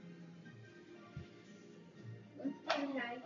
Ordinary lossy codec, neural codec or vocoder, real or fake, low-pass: AAC, 64 kbps; none; real; 7.2 kHz